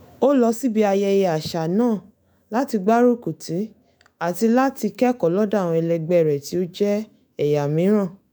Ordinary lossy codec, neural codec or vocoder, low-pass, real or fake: none; autoencoder, 48 kHz, 128 numbers a frame, DAC-VAE, trained on Japanese speech; none; fake